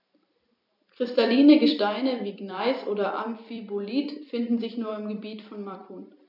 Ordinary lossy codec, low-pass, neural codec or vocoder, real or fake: none; 5.4 kHz; vocoder, 44.1 kHz, 128 mel bands every 256 samples, BigVGAN v2; fake